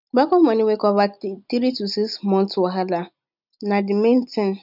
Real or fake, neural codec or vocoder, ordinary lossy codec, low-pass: real; none; none; 5.4 kHz